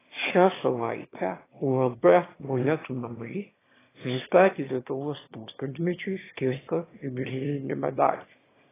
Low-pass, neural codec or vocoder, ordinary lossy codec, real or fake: 3.6 kHz; autoencoder, 22.05 kHz, a latent of 192 numbers a frame, VITS, trained on one speaker; AAC, 16 kbps; fake